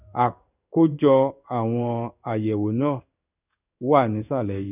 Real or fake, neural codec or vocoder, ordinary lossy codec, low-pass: fake; codec, 16 kHz in and 24 kHz out, 1 kbps, XY-Tokenizer; none; 3.6 kHz